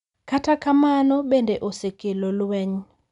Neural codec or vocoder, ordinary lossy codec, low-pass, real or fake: none; none; 10.8 kHz; real